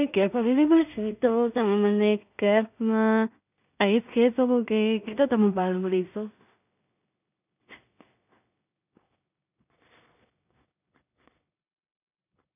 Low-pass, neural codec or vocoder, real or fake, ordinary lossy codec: 3.6 kHz; codec, 16 kHz in and 24 kHz out, 0.4 kbps, LongCat-Audio-Codec, two codebook decoder; fake; none